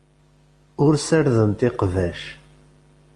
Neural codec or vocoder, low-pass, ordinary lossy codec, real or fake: none; 10.8 kHz; Opus, 32 kbps; real